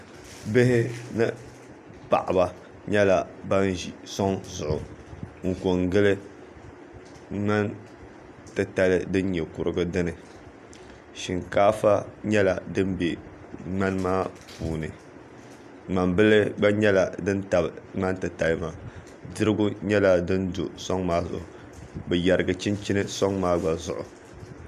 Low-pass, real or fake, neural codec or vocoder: 14.4 kHz; real; none